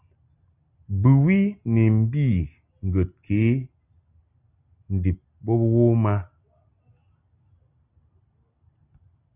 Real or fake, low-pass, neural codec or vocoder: real; 3.6 kHz; none